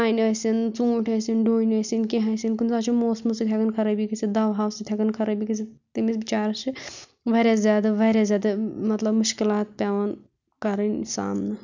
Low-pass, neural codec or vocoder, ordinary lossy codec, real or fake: 7.2 kHz; none; none; real